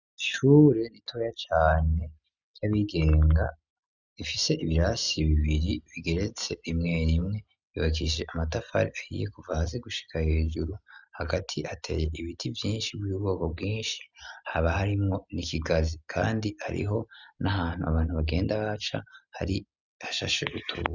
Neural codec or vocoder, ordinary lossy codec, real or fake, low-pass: none; Opus, 64 kbps; real; 7.2 kHz